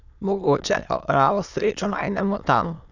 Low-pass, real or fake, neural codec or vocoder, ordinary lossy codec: 7.2 kHz; fake; autoencoder, 22.05 kHz, a latent of 192 numbers a frame, VITS, trained on many speakers; none